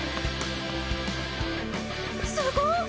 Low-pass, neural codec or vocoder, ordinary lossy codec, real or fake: none; none; none; real